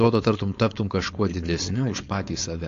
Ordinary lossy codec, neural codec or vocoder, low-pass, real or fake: AAC, 48 kbps; codec, 16 kHz, 4.8 kbps, FACodec; 7.2 kHz; fake